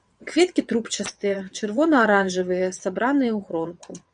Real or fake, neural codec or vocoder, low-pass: fake; vocoder, 22.05 kHz, 80 mel bands, WaveNeXt; 9.9 kHz